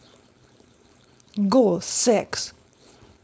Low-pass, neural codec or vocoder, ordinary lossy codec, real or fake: none; codec, 16 kHz, 4.8 kbps, FACodec; none; fake